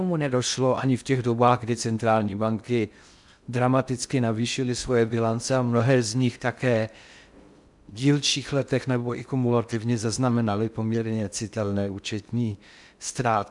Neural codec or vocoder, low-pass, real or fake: codec, 16 kHz in and 24 kHz out, 0.6 kbps, FocalCodec, streaming, 2048 codes; 10.8 kHz; fake